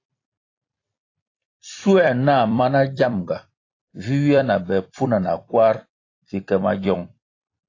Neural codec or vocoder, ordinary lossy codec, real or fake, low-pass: vocoder, 44.1 kHz, 128 mel bands every 256 samples, BigVGAN v2; AAC, 32 kbps; fake; 7.2 kHz